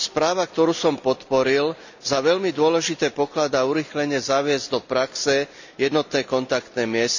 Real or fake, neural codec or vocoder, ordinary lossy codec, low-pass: real; none; none; 7.2 kHz